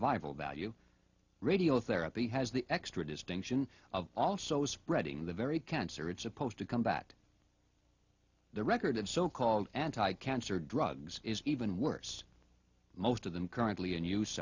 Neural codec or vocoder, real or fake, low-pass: none; real; 7.2 kHz